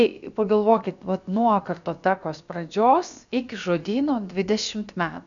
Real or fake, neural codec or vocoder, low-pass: fake; codec, 16 kHz, about 1 kbps, DyCAST, with the encoder's durations; 7.2 kHz